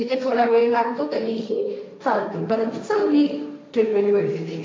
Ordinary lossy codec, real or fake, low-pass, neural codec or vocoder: none; fake; none; codec, 16 kHz, 1.1 kbps, Voila-Tokenizer